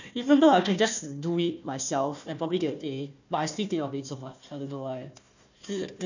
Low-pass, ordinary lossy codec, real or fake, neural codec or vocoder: 7.2 kHz; none; fake; codec, 16 kHz, 1 kbps, FunCodec, trained on Chinese and English, 50 frames a second